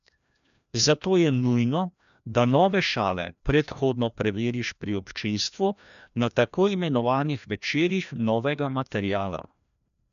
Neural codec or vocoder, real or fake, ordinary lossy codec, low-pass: codec, 16 kHz, 1 kbps, FreqCodec, larger model; fake; none; 7.2 kHz